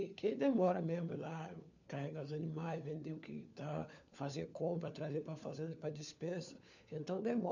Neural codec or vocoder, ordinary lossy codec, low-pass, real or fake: codec, 16 kHz, 4 kbps, FunCodec, trained on LibriTTS, 50 frames a second; none; 7.2 kHz; fake